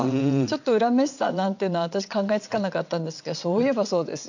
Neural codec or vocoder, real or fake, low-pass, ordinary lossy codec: vocoder, 22.05 kHz, 80 mel bands, WaveNeXt; fake; 7.2 kHz; none